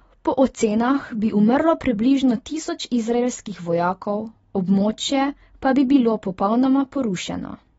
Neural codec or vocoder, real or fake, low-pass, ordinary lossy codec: none; real; 19.8 kHz; AAC, 24 kbps